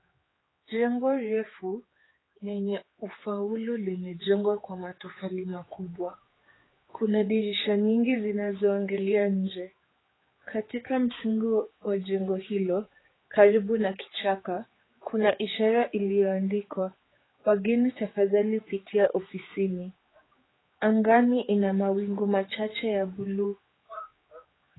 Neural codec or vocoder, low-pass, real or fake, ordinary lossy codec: codec, 16 kHz, 4 kbps, X-Codec, HuBERT features, trained on general audio; 7.2 kHz; fake; AAC, 16 kbps